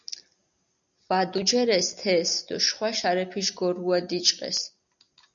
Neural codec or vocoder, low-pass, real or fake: none; 7.2 kHz; real